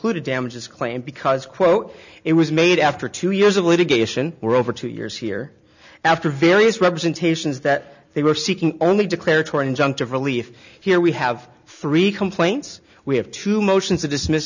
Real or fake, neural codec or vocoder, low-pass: real; none; 7.2 kHz